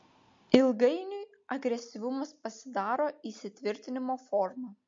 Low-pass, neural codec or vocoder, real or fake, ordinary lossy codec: 7.2 kHz; none; real; MP3, 48 kbps